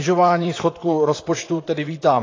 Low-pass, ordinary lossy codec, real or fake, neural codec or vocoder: 7.2 kHz; AAC, 32 kbps; fake; vocoder, 44.1 kHz, 128 mel bands, Pupu-Vocoder